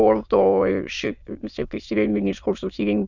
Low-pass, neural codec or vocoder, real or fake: 7.2 kHz; autoencoder, 22.05 kHz, a latent of 192 numbers a frame, VITS, trained on many speakers; fake